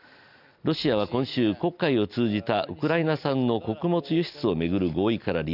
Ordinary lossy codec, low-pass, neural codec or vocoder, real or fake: none; 5.4 kHz; none; real